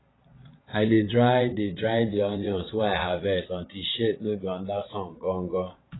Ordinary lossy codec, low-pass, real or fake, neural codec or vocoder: AAC, 16 kbps; 7.2 kHz; fake; vocoder, 22.05 kHz, 80 mel bands, Vocos